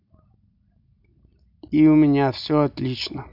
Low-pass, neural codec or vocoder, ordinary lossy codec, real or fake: 5.4 kHz; none; AAC, 48 kbps; real